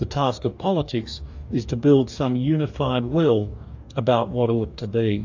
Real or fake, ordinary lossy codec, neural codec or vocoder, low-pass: fake; AAC, 48 kbps; codec, 44.1 kHz, 2.6 kbps, DAC; 7.2 kHz